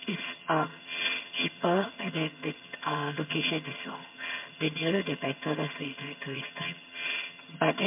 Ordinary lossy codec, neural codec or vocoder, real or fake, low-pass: MP3, 32 kbps; vocoder, 22.05 kHz, 80 mel bands, HiFi-GAN; fake; 3.6 kHz